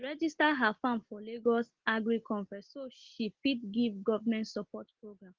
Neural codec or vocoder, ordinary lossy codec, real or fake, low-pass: none; Opus, 16 kbps; real; 7.2 kHz